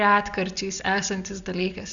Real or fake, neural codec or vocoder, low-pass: real; none; 7.2 kHz